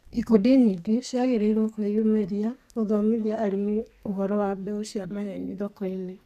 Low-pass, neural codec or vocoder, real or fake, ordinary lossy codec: 14.4 kHz; codec, 32 kHz, 1.9 kbps, SNAC; fake; none